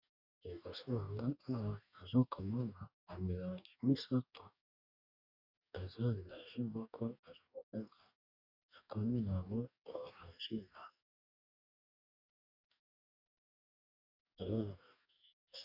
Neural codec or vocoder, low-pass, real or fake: codec, 44.1 kHz, 2.6 kbps, DAC; 5.4 kHz; fake